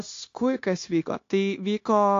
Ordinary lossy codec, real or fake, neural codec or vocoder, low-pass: AAC, 48 kbps; fake; codec, 16 kHz, 0.9 kbps, LongCat-Audio-Codec; 7.2 kHz